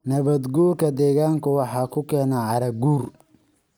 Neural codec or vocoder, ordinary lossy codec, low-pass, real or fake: none; none; none; real